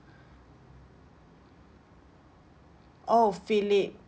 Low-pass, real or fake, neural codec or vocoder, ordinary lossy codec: none; real; none; none